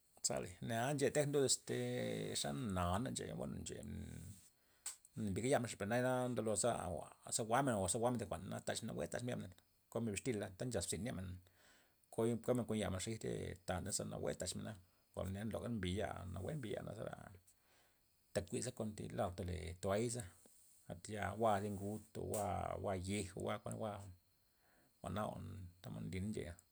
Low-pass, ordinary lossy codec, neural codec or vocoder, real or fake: none; none; none; real